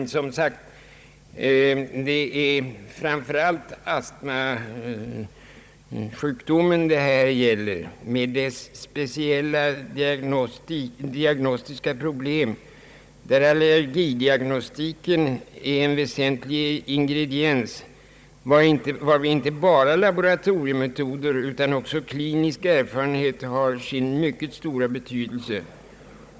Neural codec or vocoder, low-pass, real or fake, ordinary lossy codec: codec, 16 kHz, 16 kbps, FunCodec, trained on Chinese and English, 50 frames a second; none; fake; none